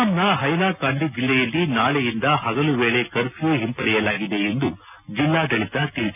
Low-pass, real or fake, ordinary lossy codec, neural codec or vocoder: 3.6 kHz; real; none; none